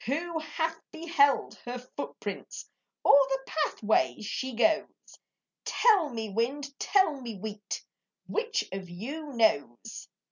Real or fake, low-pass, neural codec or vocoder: real; 7.2 kHz; none